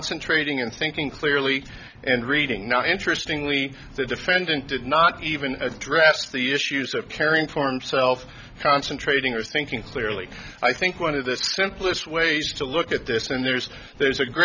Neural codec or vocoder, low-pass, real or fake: none; 7.2 kHz; real